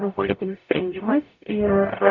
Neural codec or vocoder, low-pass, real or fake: codec, 44.1 kHz, 0.9 kbps, DAC; 7.2 kHz; fake